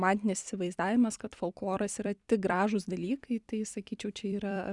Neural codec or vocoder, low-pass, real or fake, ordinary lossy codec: vocoder, 44.1 kHz, 128 mel bands every 256 samples, BigVGAN v2; 10.8 kHz; fake; Opus, 64 kbps